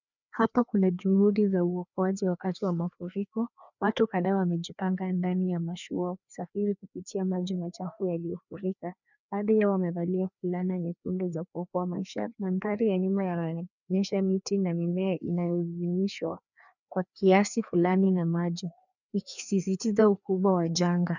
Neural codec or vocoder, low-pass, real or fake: codec, 16 kHz, 2 kbps, FreqCodec, larger model; 7.2 kHz; fake